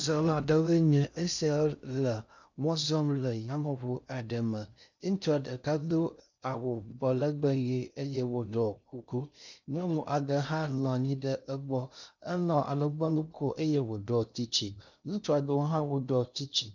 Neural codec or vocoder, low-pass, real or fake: codec, 16 kHz in and 24 kHz out, 0.6 kbps, FocalCodec, streaming, 2048 codes; 7.2 kHz; fake